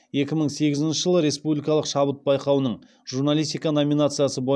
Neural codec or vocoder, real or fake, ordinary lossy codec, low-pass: none; real; none; none